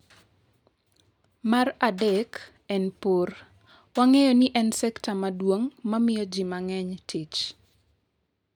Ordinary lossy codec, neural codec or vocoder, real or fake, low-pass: none; none; real; 19.8 kHz